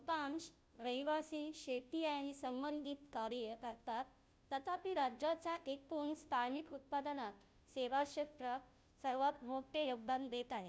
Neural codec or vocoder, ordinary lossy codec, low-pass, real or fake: codec, 16 kHz, 0.5 kbps, FunCodec, trained on Chinese and English, 25 frames a second; none; none; fake